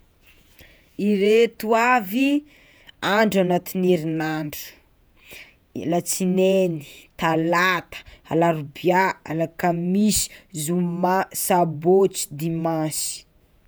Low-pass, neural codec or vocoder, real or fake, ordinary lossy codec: none; vocoder, 48 kHz, 128 mel bands, Vocos; fake; none